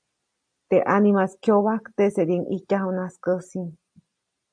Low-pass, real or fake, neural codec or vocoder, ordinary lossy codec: 9.9 kHz; real; none; AAC, 64 kbps